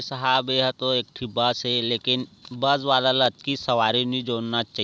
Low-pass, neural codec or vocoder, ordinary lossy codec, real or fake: none; none; none; real